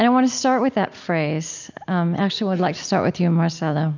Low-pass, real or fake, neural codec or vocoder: 7.2 kHz; real; none